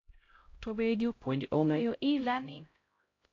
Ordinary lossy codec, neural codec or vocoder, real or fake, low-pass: AAC, 32 kbps; codec, 16 kHz, 0.5 kbps, X-Codec, HuBERT features, trained on LibriSpeech; fake; 7.2 kHz